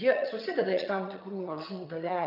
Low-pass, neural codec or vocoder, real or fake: 5.4 kHz; vocoder, 22.05 kHz, 80 mel bands, HiFi-GAN; fake